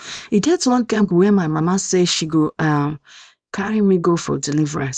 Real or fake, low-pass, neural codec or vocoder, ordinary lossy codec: fake; 9.9 kHz; codec, 24 kHz, 0.9 kbps, WavTokenizer, small release; none